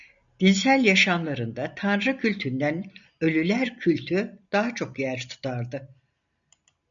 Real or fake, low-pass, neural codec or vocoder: real; 7.2 kHz; none